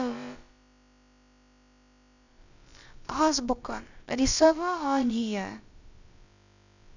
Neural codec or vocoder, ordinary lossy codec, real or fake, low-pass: codec, 16 kHz, about 1 kbps, DyCAST, with the encoder's durations; none; fake; 7.2 kHz